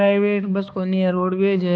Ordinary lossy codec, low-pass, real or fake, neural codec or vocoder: none; none; fake; codec, 16 kHz, 2 kbps, X-Codec, HuBERT features, trained on balanced general audio